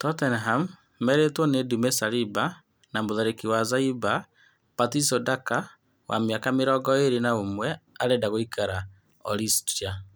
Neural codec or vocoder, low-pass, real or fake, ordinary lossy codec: none; none; real; none